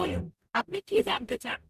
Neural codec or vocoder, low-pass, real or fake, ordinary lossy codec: codec, 44.1 kHz, 0.9 kbps, DAC; 14.4 kHz; fake; none